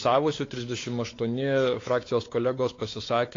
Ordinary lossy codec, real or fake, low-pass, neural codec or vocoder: AAC, 32 kbps; fake; 7.2 kHz; codec, 16 kHz, 4 kbps, X-Codec, WavLM features, trained on Multilingual LibriSpeech